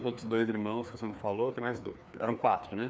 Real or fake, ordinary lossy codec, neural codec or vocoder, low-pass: fake; none; codec, 16 kHz, 2 kbps, FreqCodec, larger model; none